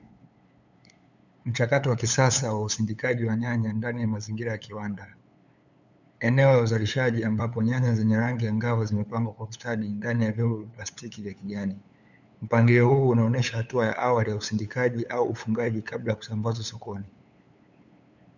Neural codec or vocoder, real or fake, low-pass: codec, 16 kHz, 8 kbps, FunCodec, trained on LibriTTS, 25 frames a second; fake; 7.2 kHz